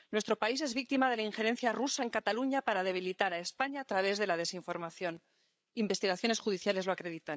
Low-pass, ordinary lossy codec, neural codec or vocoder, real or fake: none; none; codec, 16 kHz, 8 kbps, FreqCodec, larger model; fake